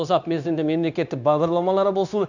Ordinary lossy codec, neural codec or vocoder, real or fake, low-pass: none; codec, 16 kHz, 0.9 kbps, LongCat-Audio-Codec; fake; 7.2 kHz